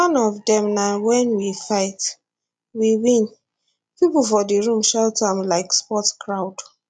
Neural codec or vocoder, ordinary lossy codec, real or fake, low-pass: none; none; real; 9.9 kHz